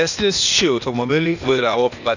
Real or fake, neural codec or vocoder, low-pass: fake; codec, 16 kHz, 0.8 kbps, ZipCodec; 7.2 kHz